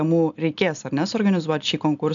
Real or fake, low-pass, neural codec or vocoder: real; 7.2 kHz; none